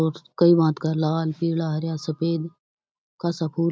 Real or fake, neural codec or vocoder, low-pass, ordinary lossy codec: real; none; none; none